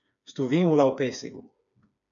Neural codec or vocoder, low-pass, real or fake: codec, 16 kHz, 4 kbps, FreqCodec, smaller model; 7.2 kHz; fake